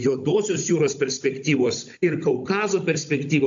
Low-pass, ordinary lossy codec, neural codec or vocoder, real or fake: 7.2 kHz; AAC, 64 kbps; codec, 16 kHz, 16 kbps, FunCodec, trained on Chinese and English, 50 frames a second; fake